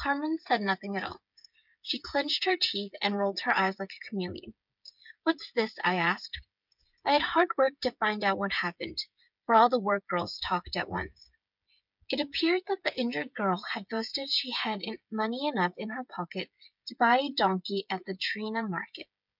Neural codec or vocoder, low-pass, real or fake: codec, 16 kHz, 8 kbps, FreqCodec, smaller model; 5.4 kHz; fake